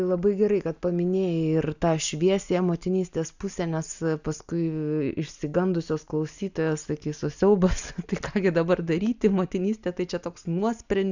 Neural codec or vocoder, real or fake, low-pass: none; real; 7.2 kHz